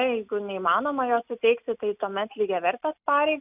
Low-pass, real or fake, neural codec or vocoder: 3.6 kHz; real; none